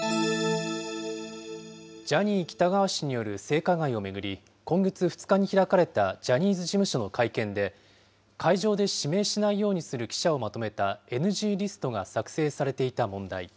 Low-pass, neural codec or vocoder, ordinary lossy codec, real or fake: none; none; none; real